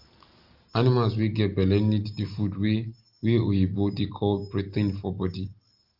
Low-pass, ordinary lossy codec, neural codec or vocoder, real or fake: 5.4 kHz; Opus, 32 kbps; none; real